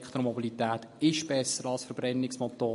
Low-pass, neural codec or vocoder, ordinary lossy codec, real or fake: 14.4 kHz; none; MP3, 48 kbps; real